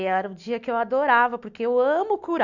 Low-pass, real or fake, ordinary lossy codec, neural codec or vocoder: 7.2 kHz; real; none; none